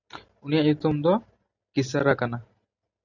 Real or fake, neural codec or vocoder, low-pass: real; none; 7.2 kHz